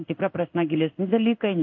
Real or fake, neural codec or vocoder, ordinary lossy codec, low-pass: fake; codec, 16 kHz in and 24 kHz out, 1 kbps, XY-Tokenizer; MP3, 48 kbps; 7.2 kHz